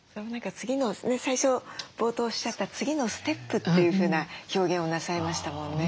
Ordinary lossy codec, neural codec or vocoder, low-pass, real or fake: none; none; none; real